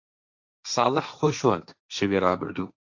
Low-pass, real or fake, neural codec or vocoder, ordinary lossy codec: 7.2 kHz; fake; codec, 16 kHz, 1.1 kbps, Voila-Tokenizer; AAC, 48 kbps